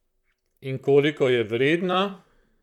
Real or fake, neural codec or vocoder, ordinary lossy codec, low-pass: fake; vocoder, 44.1 kHz, 128 mel bands, Pupu-Vocoder; none; 19.8 kHz